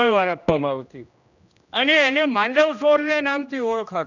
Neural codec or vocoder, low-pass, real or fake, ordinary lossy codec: codec, 16 kHz, 1 kbps, X-Codec, HuBERT features, trained on general audio; 7.2 kHz; fake; none